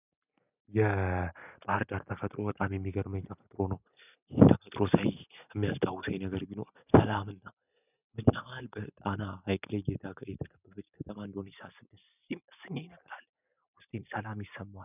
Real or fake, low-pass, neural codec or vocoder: real; 3.6 kHz; none